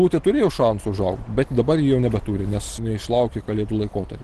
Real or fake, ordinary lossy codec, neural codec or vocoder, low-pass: real; Opus, 16 kbps; none; 10.8 kHz